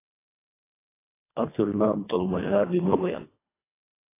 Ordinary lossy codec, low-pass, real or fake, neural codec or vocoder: AAC, 16 kbps; 3.6 kHz; fake; codec, 24 kHz, 1.5 kbps, HILCodec